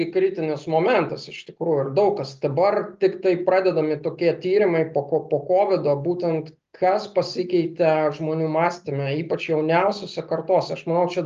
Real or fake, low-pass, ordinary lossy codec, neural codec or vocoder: real; 7.2 kHz; Opus, 32 kbps; none